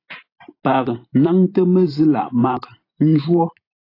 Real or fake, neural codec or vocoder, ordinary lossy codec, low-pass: fake; vocoder, 44.1 kHz, 128 mel bands every 512 samples, BigVGAN v2; AAC, 32 kbps; 5.4 kHz